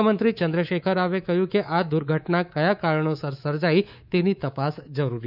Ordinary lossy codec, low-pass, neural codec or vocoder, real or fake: none; 5.4 kHz; codec, 24 kHz, 3.1 kbps, DualCodec; fake